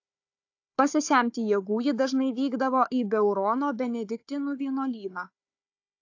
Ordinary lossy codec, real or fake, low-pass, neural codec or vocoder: AAC, 48 kbps; fake; 7.2 kHz; codec, 16 kHz, 4 kbps, FunCodec, trained on Chinese and English, 50 frames a second